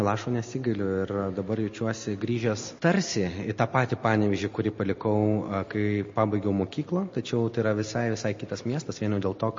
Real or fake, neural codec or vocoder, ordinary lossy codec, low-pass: real; none; MP3, 32 kbps; 7.2 kHz